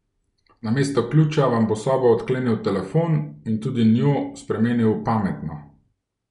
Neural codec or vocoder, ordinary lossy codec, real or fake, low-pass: none; none; real; 10.8 kHz